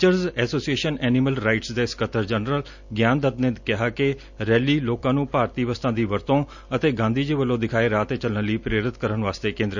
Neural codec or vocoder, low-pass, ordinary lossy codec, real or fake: none; 7.2 kHz; none; real